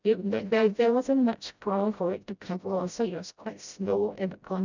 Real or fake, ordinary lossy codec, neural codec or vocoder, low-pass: fake; none; codec, 16 kHz, 0.5 kbps, FreqCodec, smaller model; 7.2 kHz